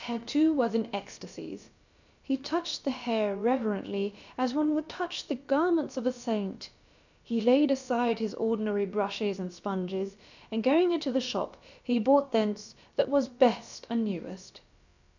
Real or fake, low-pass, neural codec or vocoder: fake; 7.2 kHz; codec, 16 kHz, about 1 kbps, DyCAST, with the encoder's durations